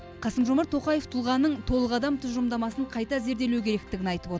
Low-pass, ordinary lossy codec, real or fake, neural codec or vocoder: none; none; real; none